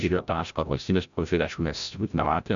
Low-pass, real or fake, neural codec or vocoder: 7.2 kHz; fake; codec, 16 kHz, 0.5 kbps, FreqCodec, larger model